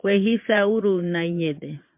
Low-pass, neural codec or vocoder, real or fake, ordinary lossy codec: 3.6 kHz; vocoder, 22.05 kHz, 80 mel bands, Vocos; fake; MP3, 32 kbps